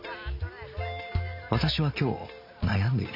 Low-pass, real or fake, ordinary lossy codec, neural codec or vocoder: 5.4 kHz; real; none; none